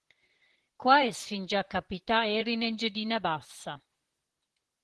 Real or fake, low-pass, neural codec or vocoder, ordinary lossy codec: fake; 10.8 kHz; vocoder, 44.1 kHz, 128 mel bands, Pupu-Vocoder; Opus, 16 kbps